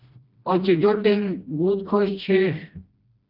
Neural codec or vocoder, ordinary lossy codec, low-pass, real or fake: codec, 16 kHz, 1 kbps, FreqCodec, smaller model; Opus, 24 kbps; 5.4 kHz; fake